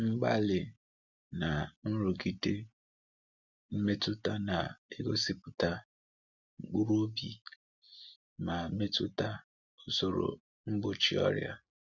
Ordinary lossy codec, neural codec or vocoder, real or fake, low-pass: none; none; real; 7.2 kHz